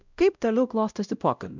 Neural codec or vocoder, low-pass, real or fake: codec, 16 kHz, 0.5 kbps, X-Codec, WavLM features, trained on Multilingual LibriSpeech; 7.2 kHz; fake